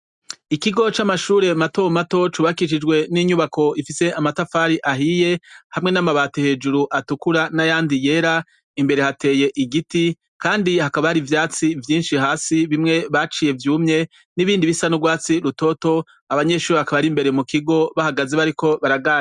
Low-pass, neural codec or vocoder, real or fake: 10.8 kHz; none; real